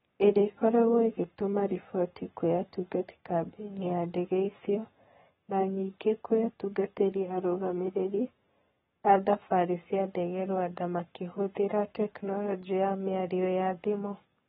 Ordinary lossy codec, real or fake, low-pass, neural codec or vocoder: AAC, 16 kbps; fake; 19.8 kHz; codec, 44.1 kHz, 7.8 kbps, Pupu-Codec